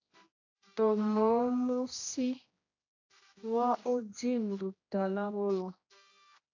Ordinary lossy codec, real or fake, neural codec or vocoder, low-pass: AAC, 48 kbps; fake; codec, 16 kHz, 1 kbps, X-Codec, HuBERT features, trained on general audio; 7.2 kHz